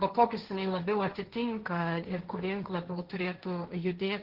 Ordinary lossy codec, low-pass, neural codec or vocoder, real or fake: Opus, 16 kbps; 5.4 kHz; codec, 16 kHz, 1.1 kbps, Voila-Tokenizer; fake